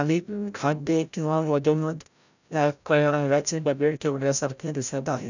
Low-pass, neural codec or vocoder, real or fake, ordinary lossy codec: 7.2 kHz; codec, 16 kHz, 0.5 kbps, FreqCodec, larger model; fake; none